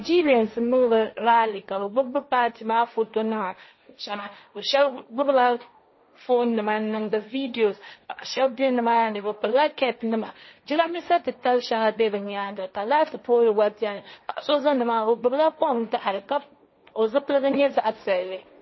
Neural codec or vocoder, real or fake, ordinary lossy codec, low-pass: codec, 16 kHz, 1.1 kbps, Voila-Tokenizer; fake; MP3, 24 kbps; 7.2 kHz